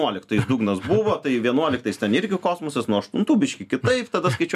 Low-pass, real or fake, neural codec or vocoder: 14.4 kHz; real; none